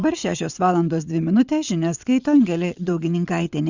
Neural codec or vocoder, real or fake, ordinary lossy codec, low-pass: vocoder, 22.05 kHz, 80 mel bands, WaveNeXt; fake; Opus, 64 kbps; 7.2 kHz